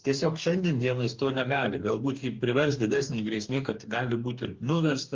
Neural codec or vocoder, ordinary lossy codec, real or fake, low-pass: codec, 44.1 kHz, 2.6 kbps, DAC; Opus, 32 kbps; fake; 7.2 kHz